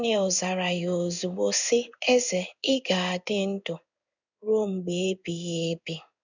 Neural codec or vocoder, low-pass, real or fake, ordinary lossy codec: codec, 16 kHz in and 24 kHz out, 1 kbps, XY-Tokenizer; 7.2 kHz; fake; none